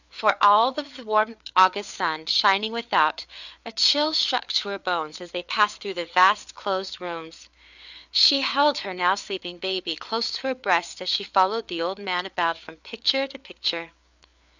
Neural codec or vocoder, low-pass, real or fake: codec, 16 kHz, 4 kbps, FreqCodec, larger model; 7.2 kHz; fake